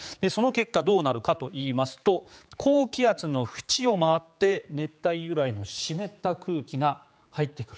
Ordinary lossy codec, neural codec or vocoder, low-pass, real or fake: none; codec, 16 kHz, 4 kbps, X-Codec, HuBERT features, trained on general audio; none; fake